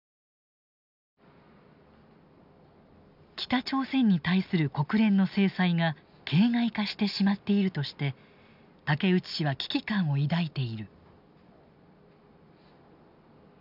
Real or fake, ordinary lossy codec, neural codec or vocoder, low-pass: real; none; none; 5.4 kHz